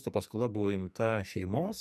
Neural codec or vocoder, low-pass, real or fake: codec, 44.1 kHz, 2.6 kbps, SNAC; 14.4 kHz; fake